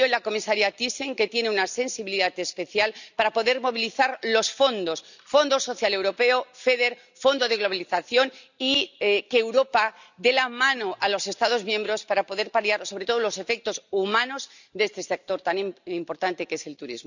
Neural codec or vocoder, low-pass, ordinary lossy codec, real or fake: none; 7.2 kHz; none; real